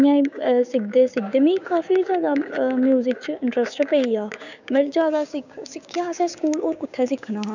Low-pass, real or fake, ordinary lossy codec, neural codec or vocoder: 7.2 kHz; fake; none; codec, 16 kHz, 6 kbps, DAC